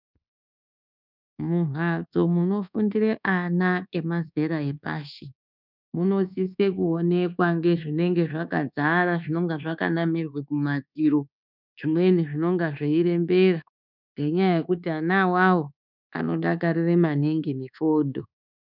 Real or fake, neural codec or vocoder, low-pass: fake; codec, 24 kHz, 1.2 kbps, DualCodec; 5.4 kHz